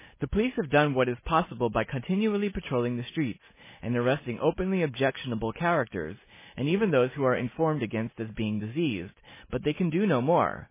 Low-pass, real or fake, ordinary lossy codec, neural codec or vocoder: 3.6 kHz; real; MP3, 16 kbps; none